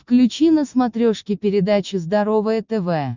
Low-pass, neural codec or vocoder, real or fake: 7.2 kHz; none; real